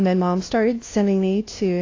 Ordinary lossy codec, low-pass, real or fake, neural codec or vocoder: AAC, 32 kbps; 7.2 kHz; fake; codec, 16 kHz, 0.5 kbps, FunCodec, trained on LibriTTS, 25 frames a second